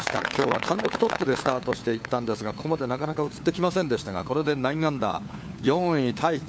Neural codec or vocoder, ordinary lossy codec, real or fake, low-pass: codec, 16 kHz, 4 kbps, FunCodec, trained on LibriTTS, 50 frames a second; none; fake; none